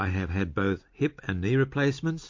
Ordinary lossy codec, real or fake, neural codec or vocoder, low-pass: MP3, 48 kbps; real; none; 7.2 kHz